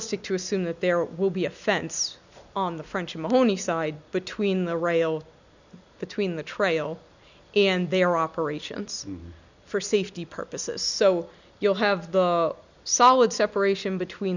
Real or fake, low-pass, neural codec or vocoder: real; 7.2 kHz; none